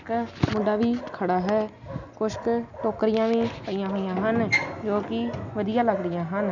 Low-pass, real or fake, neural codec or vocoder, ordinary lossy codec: 7.2 kHz; real; none; none